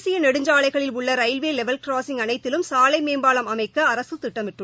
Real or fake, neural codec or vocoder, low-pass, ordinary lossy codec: real; none; none; none